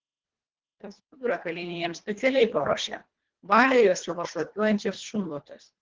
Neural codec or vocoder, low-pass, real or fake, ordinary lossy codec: codec, 24 kHz, 1.5 kbps, HILCodec; 7.2 kHz; fake; Opus, 16 kbps